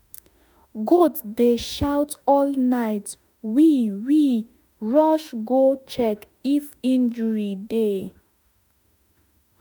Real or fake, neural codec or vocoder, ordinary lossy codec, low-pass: fake; autoencoder, 48 kHz, 32 numbers a frame, DAC-VAE, trained on Japanese speech; none; none